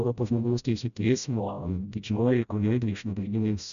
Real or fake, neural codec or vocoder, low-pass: fake; codec, 16 kHz, 0.5 kbps, FreqCodec, smaller model; 7.2 kHz